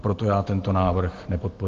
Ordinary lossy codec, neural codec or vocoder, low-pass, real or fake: Opus, 16 kbps; none; 7.2 kHz; real